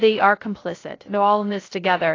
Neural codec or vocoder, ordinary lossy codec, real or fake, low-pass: codec, 16 kHz, 0.3 kbps, FocalCodec; AAC, 32 kbps; fake; 7.2 kHz